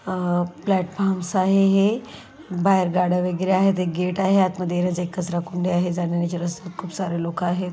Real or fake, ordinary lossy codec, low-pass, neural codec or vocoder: real; none; none; none